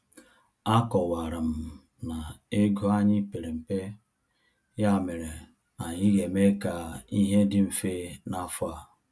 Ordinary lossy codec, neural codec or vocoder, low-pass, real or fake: none; none; none; real